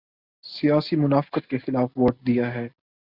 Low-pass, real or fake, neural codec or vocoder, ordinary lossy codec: 5.4 kHz; real; none; Opus, 64 kbps